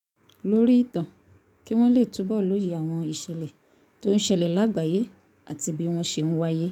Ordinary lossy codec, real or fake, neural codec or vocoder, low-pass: none; fake; codec, 44.1 kHz, 7.8 kbps, DAC; 19.8 kHz